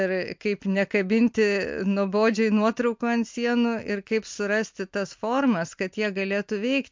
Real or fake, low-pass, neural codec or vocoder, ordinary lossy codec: real; 7.2 kHz; none; MP3, 64 kbps